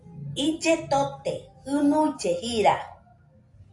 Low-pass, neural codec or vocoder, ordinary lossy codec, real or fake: 10.8 kHz; none; AAC, 64 kbps; real